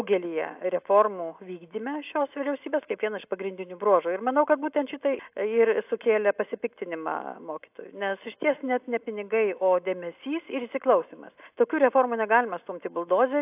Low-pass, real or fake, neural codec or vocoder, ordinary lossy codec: 3.6 kHz; real; none; AAC, 32 kbps